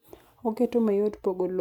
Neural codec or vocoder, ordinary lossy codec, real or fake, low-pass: none; none; real; 19.8 kHz